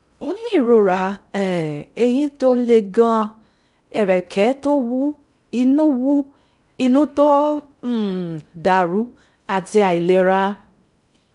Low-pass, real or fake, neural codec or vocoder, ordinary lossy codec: 10.8 kHz; fake; codec, 16 kHz in and 24 kHz out, 0.6 kbps, FocalCodec, streaming, 4096 codes; none